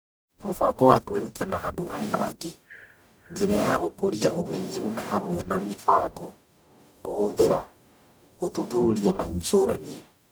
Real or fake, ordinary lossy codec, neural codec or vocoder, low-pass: fake; none; codec, 44.1 kHz, 0.9 kbps, DAC; none